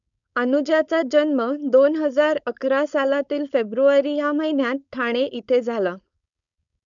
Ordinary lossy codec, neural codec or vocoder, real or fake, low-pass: MP3, 96 kbps; codec, 16 kHz, 4.8 kbps, FACodec; fake; 7.2 kHz